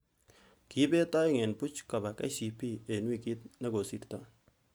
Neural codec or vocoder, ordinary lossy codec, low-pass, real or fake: none; none; none; real